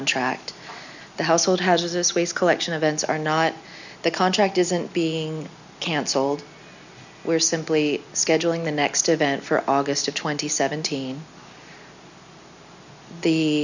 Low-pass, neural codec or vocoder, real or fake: 7.2 kHz; none; real